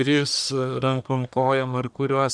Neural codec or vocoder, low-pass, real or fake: codec, 44.1 kHz, 1.7 kbps, Pupu-Codec; 9.9 kHz; fake